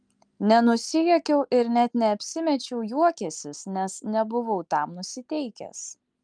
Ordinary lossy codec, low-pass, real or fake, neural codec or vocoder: Opus, 32 kbps; 9.9 kHz; real; none